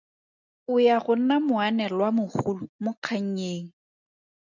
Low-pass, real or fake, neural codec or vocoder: 7.2 kHz; real; none